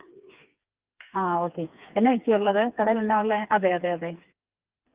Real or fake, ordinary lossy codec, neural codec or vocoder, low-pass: fake; Opus, 32 kbps; codec, 16 kHz, 4 kbps, FreqCodec, smaller model; 3.6 kHz